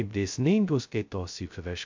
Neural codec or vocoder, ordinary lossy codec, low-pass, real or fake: codec, 16 kHz, 0.2 kbps, FocalCodec; MP3, 64 kbps; 7.2 kHz; fake